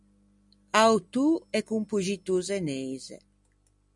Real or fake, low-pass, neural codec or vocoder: real; 10.8 kHz; none